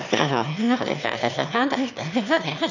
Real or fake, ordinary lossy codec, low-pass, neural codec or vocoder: fake; none; 7.2 kHz; autoencoder, 22.05 kHz, a latent of 192 numbers a frame, VITS, trained on one speaker